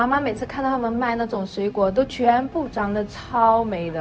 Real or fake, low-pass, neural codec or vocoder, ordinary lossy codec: fake; none; codec, 16 kHz, 0.4 kbps, LongCat-Audio-Codec; none